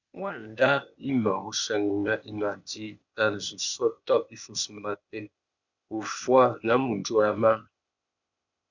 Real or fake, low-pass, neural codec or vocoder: fake; 7.2 kHz; codec, 16 kHz, 0.8 kbps, ZipCodec